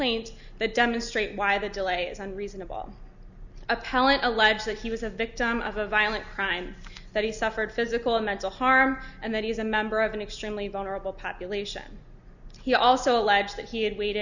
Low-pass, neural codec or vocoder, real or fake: 7.2 kHz; none; real